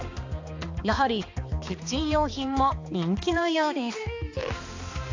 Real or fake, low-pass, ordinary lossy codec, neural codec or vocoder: fake; 7.2 kHz; AAC, 48 kbps; codec, 16 kHz, 2 kbps, X-Codec, HuBERT features, trained on balanced general audio